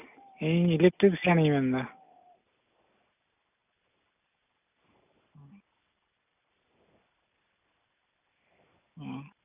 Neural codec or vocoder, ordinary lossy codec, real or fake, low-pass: none; none; real; 3.6 kHz